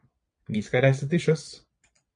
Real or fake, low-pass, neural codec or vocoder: fake; 9.9 kHz; vocoder, 22.05 kHz, 80 mel bands, Vocos